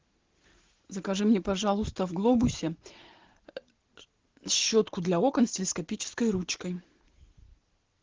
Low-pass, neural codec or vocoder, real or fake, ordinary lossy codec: 7.2 kHz; none; real; Opus, 16 kbps